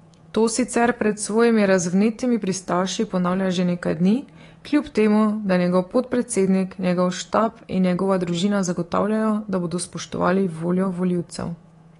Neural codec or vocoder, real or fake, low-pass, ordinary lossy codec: codec, 24 kHz, 3.1 kbps, DualCodec; fake; 10.8 kHz; AAC, 32 kbps